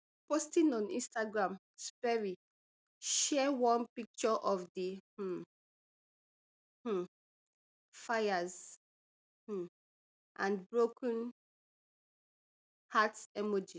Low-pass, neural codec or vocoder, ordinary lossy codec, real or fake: none; none; none; real